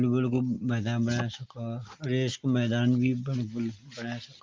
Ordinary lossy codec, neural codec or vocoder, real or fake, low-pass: Opus, 32 kbps; none; real; 7.2 kHz